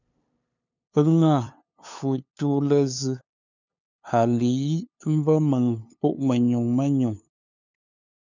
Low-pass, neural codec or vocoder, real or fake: 7.2 kHz; codec, 16 kHz, 2 kbps, FunCodec, trained on LibriTTS, 25 frames a second; fake